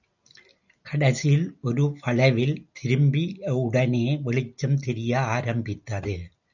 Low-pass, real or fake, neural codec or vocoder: 7.2 kHz; real; none